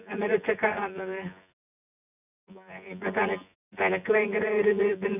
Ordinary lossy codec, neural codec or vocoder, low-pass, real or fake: none; vocoder, 24 kHz, 100 mel bands, Vocos; 3.6 kHz; fake